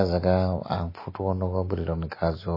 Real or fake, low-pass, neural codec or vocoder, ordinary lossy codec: real; 5.4 kHz; none; MP3, 24 kbps